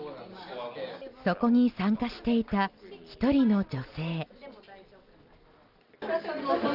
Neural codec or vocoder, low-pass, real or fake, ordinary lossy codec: none; 5.4 kHz; real; Opus, 32 kbps